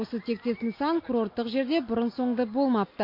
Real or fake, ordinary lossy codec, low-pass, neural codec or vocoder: real; MP3, 32 kbps; 5.4 kHz; none